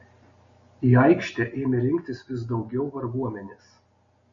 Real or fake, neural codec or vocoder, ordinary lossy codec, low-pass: real; none; MP3, 32 kbps; 7.2 kHz